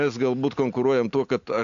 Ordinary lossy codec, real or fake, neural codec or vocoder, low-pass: AAC, 64 kbps; real; none; 7.2 kHz